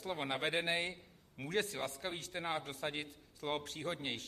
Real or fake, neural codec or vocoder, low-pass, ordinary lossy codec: fake; vocoder, 44.1 kHz, 128 mel bands, Pupu-Vocoder; 14.4 kHz; MP3, 64 kbps